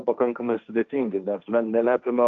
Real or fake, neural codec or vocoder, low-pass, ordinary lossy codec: fake; codec, 16 kHz, 1.1 kbps, Voila-Tokenizer; 7.2 kHz; Opus, 24 kbps